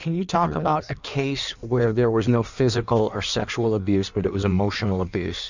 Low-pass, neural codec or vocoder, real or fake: 7.2 kHz; codec, 16 kHz in and 24 kHz out, 1.1 kbps, FireRedTTS-2 codec; fake